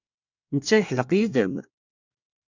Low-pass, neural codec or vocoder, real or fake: 7.2 kHz; codec, 24 kHz, 1 kbps, SNAC; fake